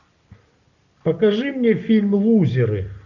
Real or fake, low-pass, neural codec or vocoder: real; 7.2 kHz; none